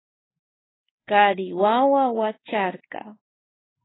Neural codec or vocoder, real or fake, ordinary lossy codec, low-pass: codec, 16 kHz in and 24 kHz out, 1 kbps, XY-Tokenizer; fake; AAC, 16 kbps; 7.2 kHz